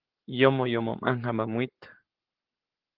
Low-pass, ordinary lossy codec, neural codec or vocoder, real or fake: 5.4 kHz; Opus, 16 kbps; codec, 44.1 kHz, 7.8 kbps, Pupu-Codec; fake